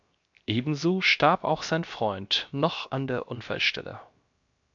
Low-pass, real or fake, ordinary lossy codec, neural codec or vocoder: 7.2 kHz; fake; MP3, 64 kbps; codec, 16 kHz, 0.7 kbps, FocalCodec